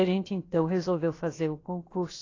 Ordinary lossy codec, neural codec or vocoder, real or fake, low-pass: AAC, 32 kbps; codec, 16 kHz, about 1 kbps, DyCAST, with the encoder's durations; fake; 7.2 kHz